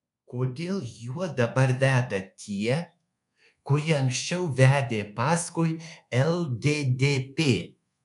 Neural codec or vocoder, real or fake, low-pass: codec, 24 kHz, 1.2 kbps, DualCodec; fake; 10.8 kHz